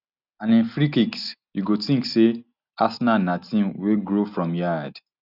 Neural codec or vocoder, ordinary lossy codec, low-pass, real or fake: none; none; 5.4 kHz; real